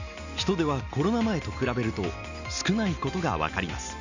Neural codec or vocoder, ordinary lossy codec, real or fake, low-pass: none; none; real; 7.2 kHz